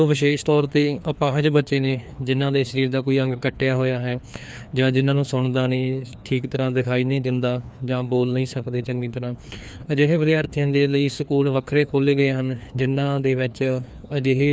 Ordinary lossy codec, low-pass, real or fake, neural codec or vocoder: none; none; fake; codec, 16 kHz, 2 kbps, FreqCodec, larger model